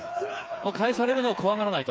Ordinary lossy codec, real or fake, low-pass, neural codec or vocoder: none; fake; none; codec, 16 kHz, 4 kbps, FreqCodec, smaller model